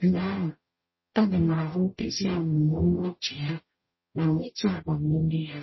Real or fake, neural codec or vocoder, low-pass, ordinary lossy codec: fake; codec, 44.1 kHz, 0.9 kbps, DAC; 7.2 kHz; MP3, 24 kbps